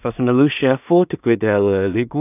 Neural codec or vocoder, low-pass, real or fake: codec, 16 kHz in and 24 kHz out, 0.4 kbps, LongCat-Audio-Codec, two codebook decoder; 3.6 kHz; fake